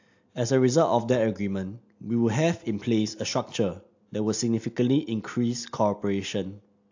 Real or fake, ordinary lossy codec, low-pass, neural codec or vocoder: real; AAC, 48 kbps; 7.2 kHz; none